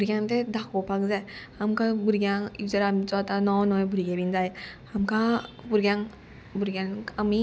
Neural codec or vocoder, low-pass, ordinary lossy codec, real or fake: none; none; none; real